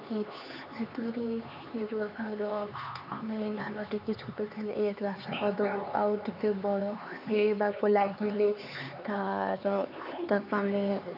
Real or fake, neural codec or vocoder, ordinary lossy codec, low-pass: fake; codec, 16 kHz, 4 kbps, X-Codec, HuBERT features, trained on LibriSpeech; none; 5.4 kHz